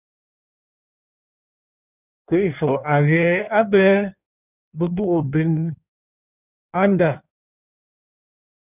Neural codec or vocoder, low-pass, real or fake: codec, 16 kHz in and 24 kHz out, 1.1 kbps, FireRedTTS-2 codec; 3.6 kHz; fake